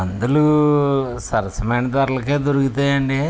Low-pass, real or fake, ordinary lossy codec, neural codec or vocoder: none; real; none; none